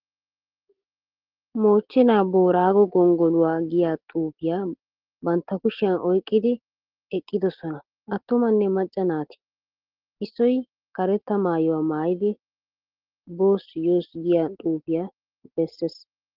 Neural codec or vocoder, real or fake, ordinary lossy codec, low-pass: none; real; Opus, 16 kbps; 5.4 kHz